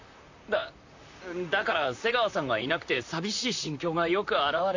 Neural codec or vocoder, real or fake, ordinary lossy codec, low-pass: vocoder, 44.1 kHz, 128 mel bands, Pupu-Vocoder; fake; AAC, 48 kbps; 7.2 kHz